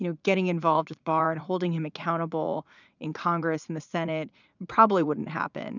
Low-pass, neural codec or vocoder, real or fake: 7.2 kHz; vocoder, 44.1 kHz, 80 mel bands, Vocos; fake